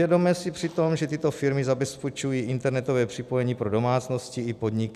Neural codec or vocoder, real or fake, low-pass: none; real; 14.4 kHz